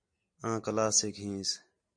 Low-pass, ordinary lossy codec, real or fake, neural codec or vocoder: 9.9 kHz; MP3, 48 kbps; real; none